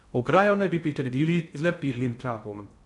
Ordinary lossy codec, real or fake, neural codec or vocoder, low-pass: none; fake; codec, 16 kHz in and 24 kHz out, 0.6 kbps, FocalCodec, streaming, 2048 codes; 10.8 kHz